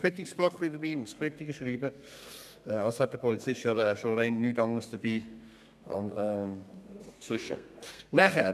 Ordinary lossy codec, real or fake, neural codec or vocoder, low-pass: none; fake; codec, 32 kHz, 1.9 kbps, SNAC; 14.4 kHz